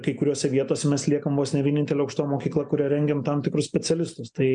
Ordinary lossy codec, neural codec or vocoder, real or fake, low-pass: MP3, 96 kbps; none; real; 9.9 kHz